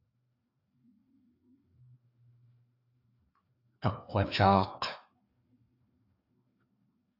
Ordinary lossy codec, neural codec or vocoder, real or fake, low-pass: AAC, 32 kbps; codec, 16 kHz, 2 kbps, FreqCodec, larger model; fake; 5.4 kHz